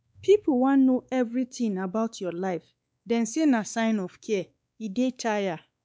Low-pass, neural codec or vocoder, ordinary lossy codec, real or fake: none; codec, 16 kHz, 4 kbps, X-Codec, WavLM features, trained on Multilingual LibriSpeech; none; fake